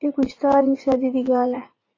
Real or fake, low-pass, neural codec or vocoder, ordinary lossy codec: fake; 7.2 kHz; vocoder, 44.1 kHz, 80 mel bands, Vocos; AAC, 32 kbps